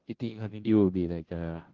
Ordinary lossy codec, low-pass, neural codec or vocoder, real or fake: Opus, 16 kbps; 7.2 kHz; codec, 16 kHz, 0.5 kbps, FunCodec, trained on Chinese and English, 25 frames a second; fake